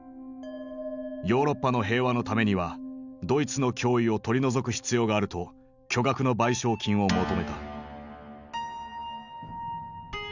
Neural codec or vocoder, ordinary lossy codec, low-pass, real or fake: none; none; 7.2 kHz; real